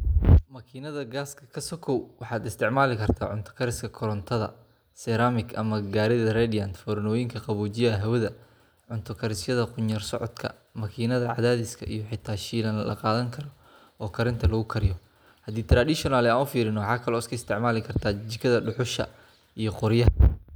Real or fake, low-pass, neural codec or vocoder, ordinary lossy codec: real; none; none; none